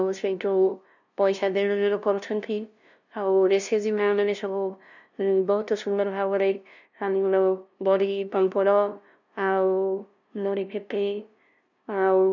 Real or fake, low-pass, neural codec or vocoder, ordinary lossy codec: fake; 7.2 kHz; codec, 16 kHz, 0.5 kbps, FunCodec, trained on LibriTTS, 25 frames a second; none